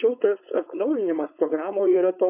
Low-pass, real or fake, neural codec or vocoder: 3.6 kHz; fake; codec, 16 kHz, 4.8 kbps, FACodec